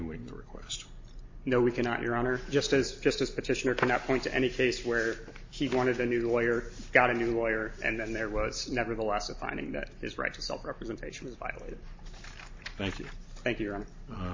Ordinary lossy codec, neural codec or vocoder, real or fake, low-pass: MP3, 32 kbps; none; real; 7.2 kHz